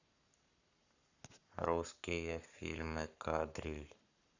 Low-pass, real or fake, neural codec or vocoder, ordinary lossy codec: 7.2 kHz; fake; codec, 44.1 kHz, 7.8 kbps, Pupu-Codec; none